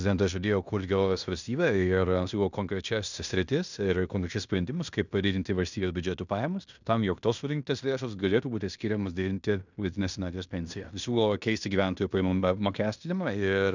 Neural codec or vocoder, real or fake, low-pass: codec, 16 kHz in and 24 kHz out, 0.9 kbps, LongCat-Audio-Codec, fine tuned four codebook decoder; fake; 7.2 kHz